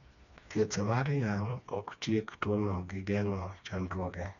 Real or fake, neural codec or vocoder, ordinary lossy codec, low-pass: fake; codec, 16 kHz, 2 kbps, FreqCodec, smaller model; none; 7.2 kHz